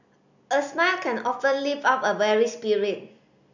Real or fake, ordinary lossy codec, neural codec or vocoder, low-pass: real; none; none; 7.2 kHz